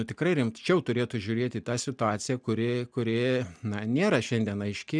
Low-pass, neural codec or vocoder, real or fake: 9.9 kHz; none; real